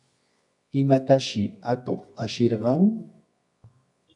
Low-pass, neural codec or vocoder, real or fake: 10.8 kHz; codec, 24 kHz, 0.9 kbps, WavTokenizer, medium music audio release; fake